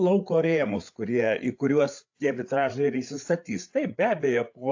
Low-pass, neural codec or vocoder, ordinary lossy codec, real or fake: 7.2 kHz; codec, 16 kHz, 8 kbps, FreqCodec, larger model; AAC, 48 kbps; fake